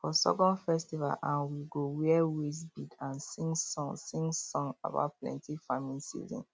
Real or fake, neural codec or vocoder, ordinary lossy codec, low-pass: real; none; none; none